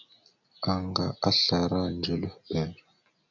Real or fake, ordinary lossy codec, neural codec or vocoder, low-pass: real; MP3, 64 kbps; none; 7.2 kHz